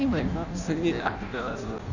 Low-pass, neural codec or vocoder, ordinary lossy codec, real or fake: 7.2 kHz; codec, 16 kHz in and 24 kHz out, 0.6 kbps, FireRedTTS-2 codec; none; fake